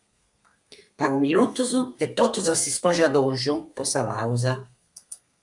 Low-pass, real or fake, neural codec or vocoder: 10.8 kHz; fake; codec, 32 kHz, 1.9 kbps, SNAC